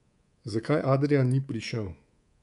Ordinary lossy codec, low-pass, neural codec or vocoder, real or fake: none; 10.8 kHz; codec, 24 kHz, 3.1 kbps, DualCodec; fake